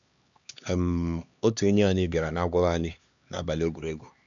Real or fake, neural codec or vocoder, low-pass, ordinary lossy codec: fake; codec, 16 kHz, 2 kbps, X-Codec, HuBERT features, trained on LibriSpeech; 7.2 kHz; none